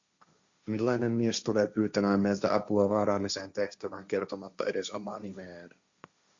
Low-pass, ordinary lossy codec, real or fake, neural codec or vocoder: 7.2 kHz; Opus, 64 kbps; fake; codec, 16 kHz, 1.1 kbps, Voila-Tokenizer